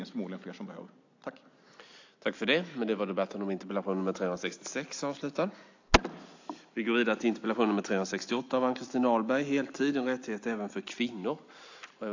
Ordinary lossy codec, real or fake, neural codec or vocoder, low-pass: AAC, 48 kbps; real; none; 7.2 kHz